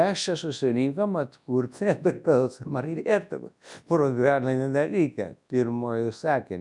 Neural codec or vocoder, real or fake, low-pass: codec, 24 kHz, 0.9 kbps, WavTokenizer, large speech release; fake; 10.8 kHz